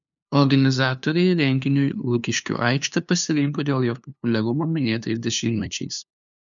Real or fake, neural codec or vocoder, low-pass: fake; codec, 16 kHz, 2 kbps, FunCodec, trained on LibriTTS, 25 frames a second; 7.2 kHz